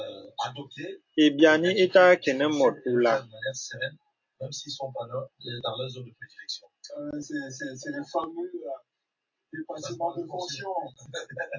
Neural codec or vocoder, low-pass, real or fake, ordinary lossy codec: none; 7.2 kHz; real; AAC, 48 kbps